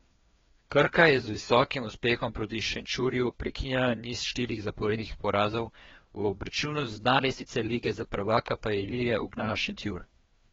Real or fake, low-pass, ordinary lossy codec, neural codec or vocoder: fake; 7.2 kHz; AAC, 24 kbps; codec, 16 kHz, 2 kbps, FreqCodec, larger model